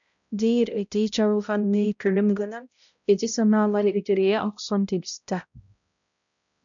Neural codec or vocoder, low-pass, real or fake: codec, 16 kHz, 0.5 kbps, X-Codec, HuBERT features, trained on balanced general audio; 7.2 kHz; fake